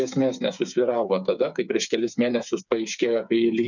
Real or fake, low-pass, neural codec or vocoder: fake; 7.2 kHz; codec, 16 kHz, 8 kbps, FreqCodec, smaller model